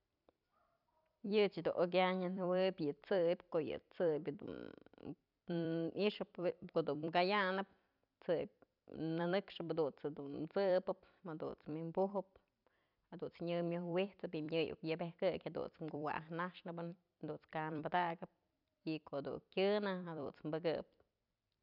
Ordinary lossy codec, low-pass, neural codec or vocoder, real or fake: none; 5.4 kHz; vocoder, 44.1 kHz, 128 mel bands every 256 samples, BigVGAN v2; fake